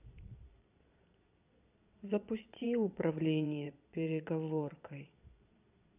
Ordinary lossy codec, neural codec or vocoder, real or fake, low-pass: none; vocoder, 22.05 kHz, 80 mel bands, Vocos; fake; 3.6 kHz